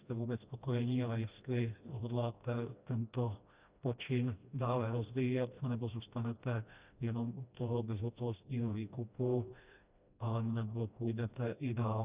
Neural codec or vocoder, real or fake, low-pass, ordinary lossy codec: codec, 16 kHz, 1 kbps, FreqCodec, smaller model; fake; 3.6 kHz; Opus, 64 kbps